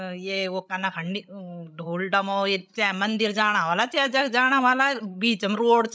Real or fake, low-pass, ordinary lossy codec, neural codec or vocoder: fake; none; none; codec, 16 kHz, 16 kbps, FreqCodec, larger model